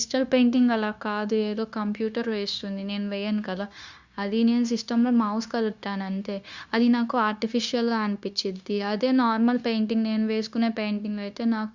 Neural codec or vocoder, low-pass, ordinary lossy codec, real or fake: codec, 16 kHz, 0.9 kbps, LongCat-Audio-Codec; 7.2 kHz; Opus, 64 kbps; fake